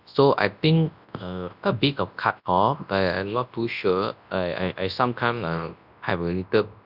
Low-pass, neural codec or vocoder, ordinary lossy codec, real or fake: 5.4 kHz; codec, 24 kHz, 0.9 kbps, WavTokenizer, large speech release; none; fake